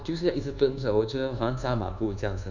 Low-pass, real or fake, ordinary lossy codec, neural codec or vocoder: 7.2 kHz; fake; none; codec, 24 kHz, 1.2 kbps, DualCodec